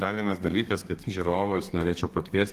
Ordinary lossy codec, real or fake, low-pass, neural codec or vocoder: Opus, 24 kbps; fake; 14.4 kHz; codec, 32 kHz, 1.9 kbps, SNAC